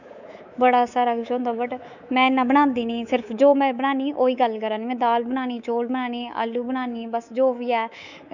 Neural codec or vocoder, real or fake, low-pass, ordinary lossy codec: codec, 24 kHz, 3.1 kbps, DualCodec; fake; 7.2 kHz; none